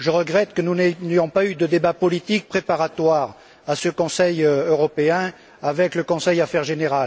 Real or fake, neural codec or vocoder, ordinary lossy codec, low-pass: real; none; none; none